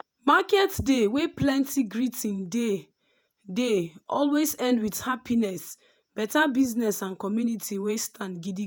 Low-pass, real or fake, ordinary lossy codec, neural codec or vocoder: none; fake; none; vocoder, 48 kHz, 128 mel bands, Vocos